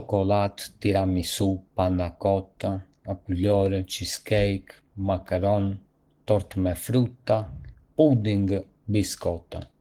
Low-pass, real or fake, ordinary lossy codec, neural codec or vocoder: 19.8 kHz; fake; Opus, 16 kbps; codec, 44.1 kHz, 7.8 kbps, Pupu-Codec